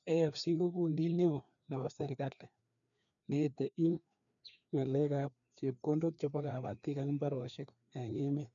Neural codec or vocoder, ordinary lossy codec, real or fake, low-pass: codec, 16 kHz, 2 kbps, FreqCodec, larger model; none; fake; 7.2 kHz